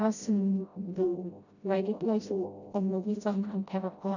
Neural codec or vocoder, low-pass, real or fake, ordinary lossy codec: codec, 16 kHz, 0.5 kbps, FreqCodec, smaller model; 7.2 kHz; fake; none